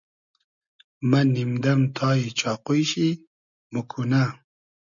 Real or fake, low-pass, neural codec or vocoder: real; 7.2 kHz; none